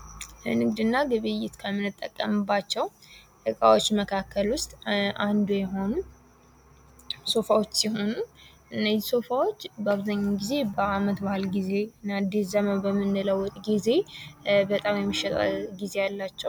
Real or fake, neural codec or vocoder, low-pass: real; none; 19.8 kHz